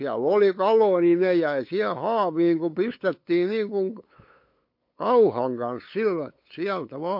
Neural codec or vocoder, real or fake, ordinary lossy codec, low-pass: codec, 16 kHz, 8 kbps, FunCodec, trained on LibriTTS, 25 frames a second; fake; MP3, 32 kbps; 5.4 kHz